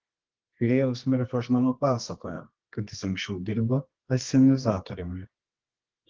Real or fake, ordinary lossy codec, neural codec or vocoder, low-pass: fake; Opus, 16 kbps; codec, 24 kHz, 0.9 kbps, WavTokenizer, medium music audio release; 7.2 kHz